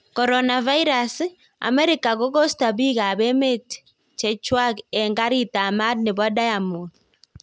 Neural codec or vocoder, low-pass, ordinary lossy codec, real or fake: none; none; none; real